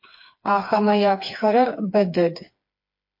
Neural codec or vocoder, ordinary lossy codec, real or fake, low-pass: codec, 16 kHz, 4 kbps, FreqCodec, smaller model; MP3, 32 kbps; fake; 5.4 kHz